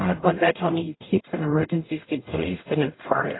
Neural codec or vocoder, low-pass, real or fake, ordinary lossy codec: codec, 44.1 kHz, 0.9 kbps, DAC; 7.2 kHz; fake; AAC, 16 kbps